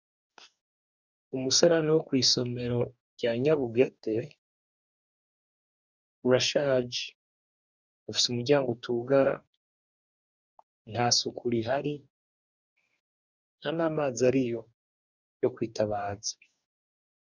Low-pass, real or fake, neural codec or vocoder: 7.2 kHz; fake; codec, 44.1 kHz, 2.6 kbps, DAC